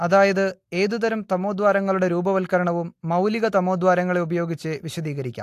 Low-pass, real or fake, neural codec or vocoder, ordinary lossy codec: 14.4 kHz; real; none; AAC, 64 kbps